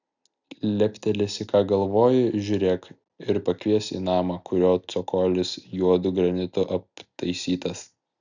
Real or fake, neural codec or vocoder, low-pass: real; none; 7.2 kHz